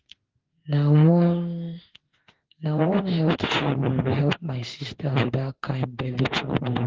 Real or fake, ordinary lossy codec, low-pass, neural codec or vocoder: fake; Opus, 16 kbps; 7.2 kHz; autoencoder, 48 kHz, 32 numbers a frame, DAC-VAE, trained on Japanese speech